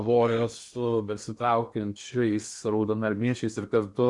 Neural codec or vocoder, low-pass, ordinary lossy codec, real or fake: codec, 16 kHz in and 24 kHz out, 0.8 kbps, FocalCodec, streaming, 65536 codes; 10.8 kHz; Opus, 64 kbps; fake